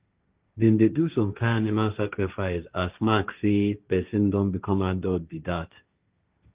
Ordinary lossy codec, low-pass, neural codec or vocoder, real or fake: Opus, 32 kbps; 3.6 kHz; codec, 16 kHz, 1.1 kbps, Voila-Tokenizer; fake